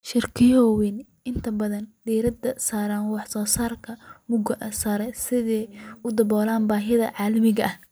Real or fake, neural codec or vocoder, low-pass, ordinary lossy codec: real; none; none; none